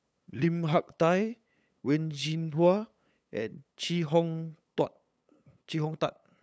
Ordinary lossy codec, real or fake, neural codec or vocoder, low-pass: none; fake; codec, 16 kHz, 8 kbps, FunCodec, trained on LibriTTS, 25 frames a second; none